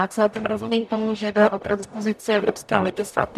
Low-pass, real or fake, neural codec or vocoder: 14.4 kHz; fake; codec, 44.1 kHz, 0.9 kbps, DAC